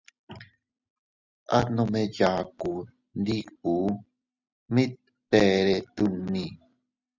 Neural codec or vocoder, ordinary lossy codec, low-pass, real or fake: none; Opus, 64 kbps; 7.2 kHz; real